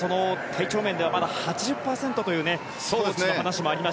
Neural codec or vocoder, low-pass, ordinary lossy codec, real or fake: none; none; none; real